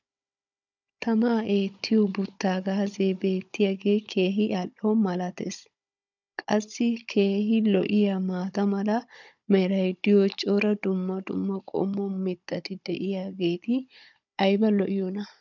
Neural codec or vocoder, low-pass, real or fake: codec, 16 kHz, 16 kbps, FunCodec, trained on Chinese and English, 50 frames a second; 7.2 kHz; fake